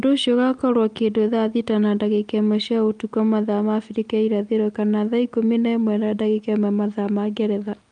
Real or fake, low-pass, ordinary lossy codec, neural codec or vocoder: real; 9.9 kHz; Opus, 32 kbps; none